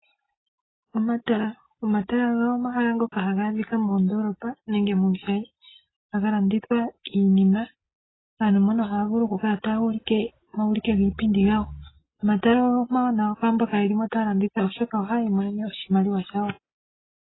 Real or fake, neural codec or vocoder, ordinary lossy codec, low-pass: real; none; AAC, 16 kbps; 7.2 kHz